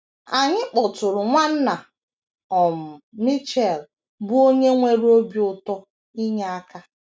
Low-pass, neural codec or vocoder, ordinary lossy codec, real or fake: none; none; none; real